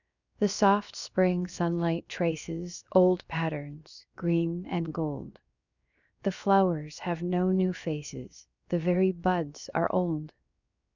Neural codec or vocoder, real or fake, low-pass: codec, 16 kHz, 0.7 kbps, FocalCodec; fake; 7.2 kHz